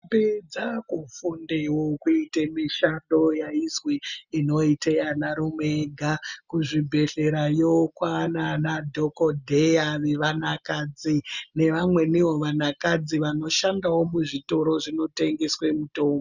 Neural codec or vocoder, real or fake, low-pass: none; real; 7.2 kHz